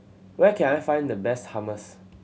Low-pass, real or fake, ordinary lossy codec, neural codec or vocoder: none; real; none; none